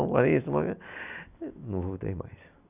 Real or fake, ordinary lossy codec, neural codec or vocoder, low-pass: real; none; none; 3.6 kHz